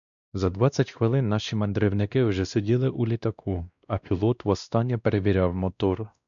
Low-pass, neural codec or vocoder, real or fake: 7.2 kHz; codec, 16 kHz, 1 kbps, X-Codec, WavLM features, trained on Multilingual LibriSpeech; fake